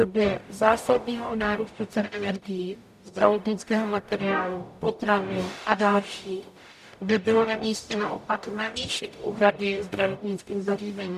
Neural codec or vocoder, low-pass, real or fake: codec, 44.1 kHz, 0.9 kbps, DAC; 14.4 kHz; fake